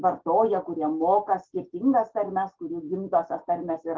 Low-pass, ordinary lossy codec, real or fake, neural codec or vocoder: 7.2 kHz; Opus, 16 kbps; real; none